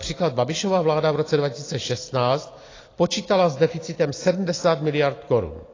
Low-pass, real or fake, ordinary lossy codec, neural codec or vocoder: 7.2 kHz; real; AAC, 32 kbps; none